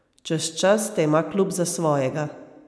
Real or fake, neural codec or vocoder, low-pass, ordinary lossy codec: real; none; none; none